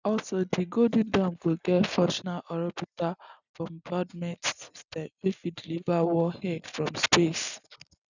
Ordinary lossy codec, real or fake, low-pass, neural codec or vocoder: none; real; 7.2 kHz; none